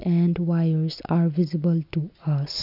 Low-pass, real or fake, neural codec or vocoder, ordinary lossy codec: 5.4 kHz; real; none; none